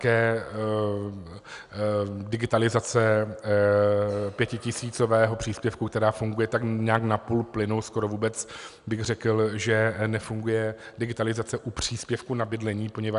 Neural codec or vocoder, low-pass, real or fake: none; 10.8 kHz; real